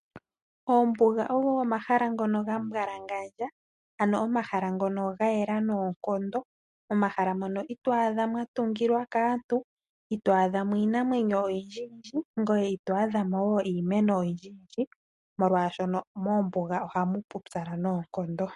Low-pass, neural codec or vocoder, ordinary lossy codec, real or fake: 14.4 kHz; none; MP3, 48 kbps; real